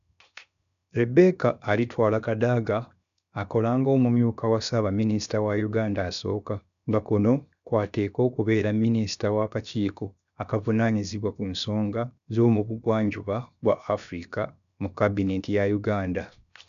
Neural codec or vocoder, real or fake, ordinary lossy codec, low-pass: codec, 16 kHz, 0.7 kbps, FocalCodec; fake; none; 7.2 kHz